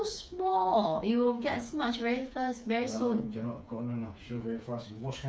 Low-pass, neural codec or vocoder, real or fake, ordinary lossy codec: none; codec, 16 kHz, 4 kbps, FreqCodec, smaller model; fake; none